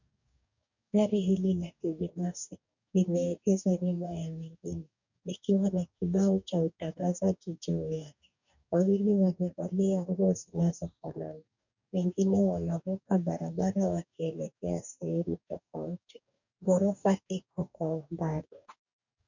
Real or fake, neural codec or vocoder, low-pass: fake; codec, 44.1 kHz, 2.6 kbps, DAC; 7.2 kHz